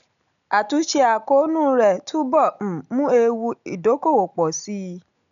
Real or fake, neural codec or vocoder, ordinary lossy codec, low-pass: real; none; none; 7.2 kHz